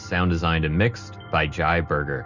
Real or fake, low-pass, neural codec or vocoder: real; 7.2 kHz; none